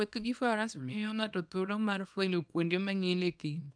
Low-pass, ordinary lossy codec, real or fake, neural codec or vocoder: 9.9 kHz; none; fake; codec, 24 kHz, 0.9 kbps, WavTokenizer, small release